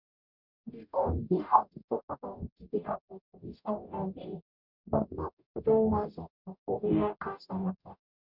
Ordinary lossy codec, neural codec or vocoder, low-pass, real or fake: none; codec, 44.1 kHz, 0.9 kbps, DAC; 5.4 kHz; fake